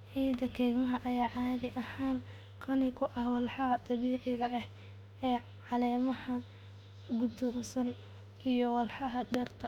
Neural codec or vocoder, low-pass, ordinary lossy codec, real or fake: autoencoder, 48 kHz, 32 numbers a frame, DAC-VAE, trained on Japanese speech; 19.8 kHz; none; fake